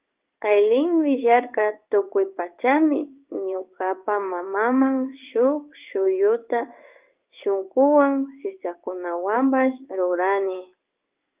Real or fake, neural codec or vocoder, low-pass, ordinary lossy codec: fake; codec, 16 kHz in and 24 kHz out, 1 kbps, XY-Tokenizer; 3.6 kHz; Opus, 32 kbps